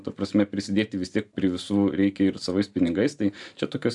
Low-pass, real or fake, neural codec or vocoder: 10.8 kHz; fake; vocoder, 44.1 kHz, 128 mel bands every 512 samples, BigVGAN v2